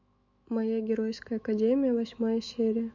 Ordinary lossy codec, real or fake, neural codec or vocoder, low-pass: MP3, 48 kbps; fake; autoencoder, 48 kHz, 128 numbers a frame, DAC-VAE, trained on Japanese speech; 7.2 kHz